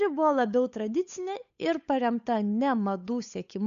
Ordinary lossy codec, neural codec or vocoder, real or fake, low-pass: MP3, 96 kbps; codec, 16 kHz, 8 kbps, FunCodec, trained on Chinese and English, 25 frames a second; fake; 7.2 kHz